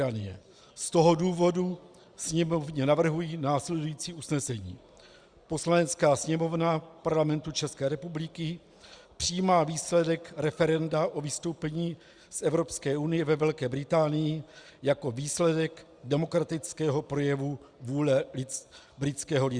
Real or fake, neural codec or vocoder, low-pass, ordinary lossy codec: real; none; 9.9 kHz; Opus, 64 kbps